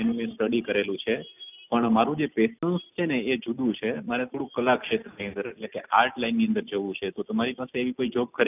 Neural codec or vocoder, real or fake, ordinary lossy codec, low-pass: none; real; none; 3.6 kHz